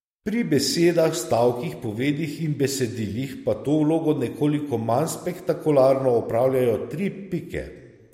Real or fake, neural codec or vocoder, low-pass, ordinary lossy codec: real; none; 19.8 kHz; MP3, 64 kbps